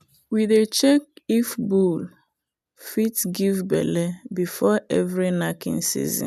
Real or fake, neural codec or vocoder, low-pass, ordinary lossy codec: real; none; 14.4 kHz; none